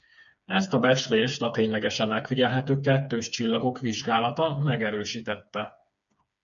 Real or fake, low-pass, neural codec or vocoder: fake; 7.2 kHz; codec, 16 kHz, 4 kbps, FreqCodec, smaller model